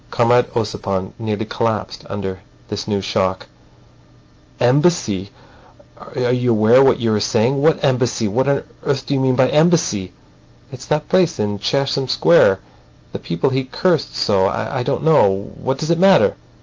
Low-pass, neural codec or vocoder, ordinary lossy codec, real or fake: 7.2 kHz; none; Opus, 24 kbps; real